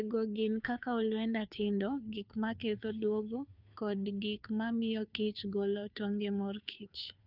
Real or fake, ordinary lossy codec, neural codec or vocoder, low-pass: fake; none; codec, 16 kHz, 2 kbps, FunCodec, trained on Chinese and English, 25 frames a second; 5.4 kHz